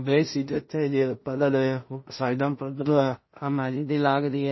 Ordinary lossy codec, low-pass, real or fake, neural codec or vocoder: MP3, 24 kbps; 7.2 kHz; fake; codec, 16 kHz in and 24 kHz out, 0.4 kbps, LongCat-Audio-Codec, two codebook decoder